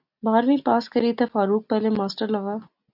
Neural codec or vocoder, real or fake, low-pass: none; real; 5.4 kHz